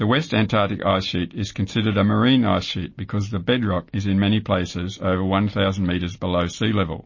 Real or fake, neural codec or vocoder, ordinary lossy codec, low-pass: real; none; MP3, 32 kbps; 7.2 kHz